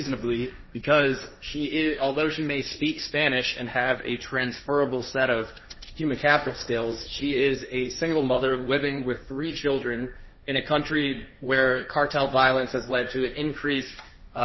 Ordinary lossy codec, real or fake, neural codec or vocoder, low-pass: MP3, 24 kbps; fake; codec, 16 kHz, 1.1 kbps, Voila-Tokenizer; 7.2 kHz